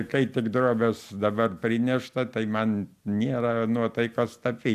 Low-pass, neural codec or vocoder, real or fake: 14.4 kHz; none; real